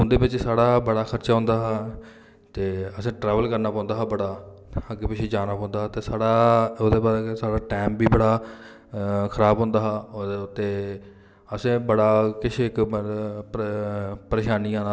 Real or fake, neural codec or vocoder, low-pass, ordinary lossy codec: real; none; none; none